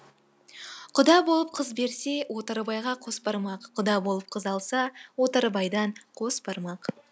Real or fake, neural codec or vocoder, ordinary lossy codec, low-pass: real; none; none; none